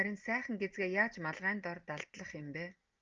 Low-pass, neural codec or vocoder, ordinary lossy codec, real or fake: 7.2 kHz; none; Opus, 24 kbps; real